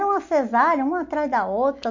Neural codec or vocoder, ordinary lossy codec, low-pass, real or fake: none; MP3, 48 kbps; 7.2 kHz; real